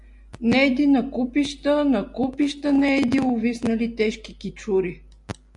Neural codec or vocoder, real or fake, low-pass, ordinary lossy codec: none; real; 10.8 kHz; MP3, 64 kbps